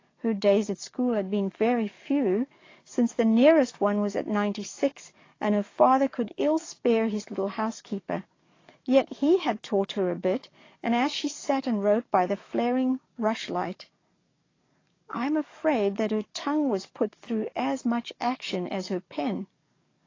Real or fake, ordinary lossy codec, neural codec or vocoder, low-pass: fake; AAC, 32 kbps; codec, 44.1 kHz, 7.8 kbps, DAC; 7.2 kHz